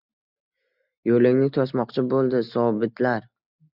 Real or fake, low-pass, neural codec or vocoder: real; 5.4 kHz; none